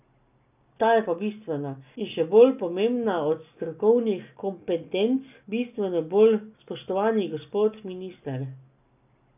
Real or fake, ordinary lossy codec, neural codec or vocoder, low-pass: real; none; none; 3.6 kHz